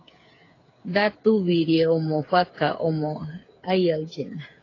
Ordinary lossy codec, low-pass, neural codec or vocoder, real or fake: AAC, 32 kbps; 7.2 kHz; codec, 16 kHz, 8 kbps, FreqCodec, smaller model; fake